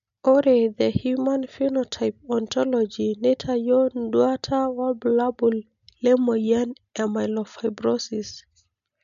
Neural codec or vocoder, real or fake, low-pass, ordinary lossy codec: none; real; 7.2 kHz; none